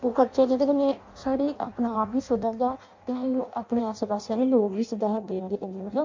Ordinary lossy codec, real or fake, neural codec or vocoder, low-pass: MP3, 48 kbps; fake; codec, 16 kHz in and 24 kHz out, 0.6 kbps, FireRedTTS-2 codec; 7.2 kHz